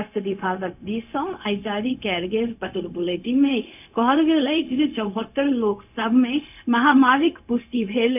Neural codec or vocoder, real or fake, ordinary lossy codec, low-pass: codec, 16 kHz, 0.4 kbps, LongCat-Audio-Codec; fake; none; 3.6 kHz